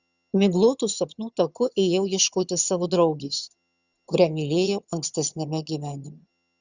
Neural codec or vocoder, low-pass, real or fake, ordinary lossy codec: vocoder, 22.05 kHz, 80 mel bands, HiFi-GAN; 7.2 kHz; fake; Opus, 64 kbps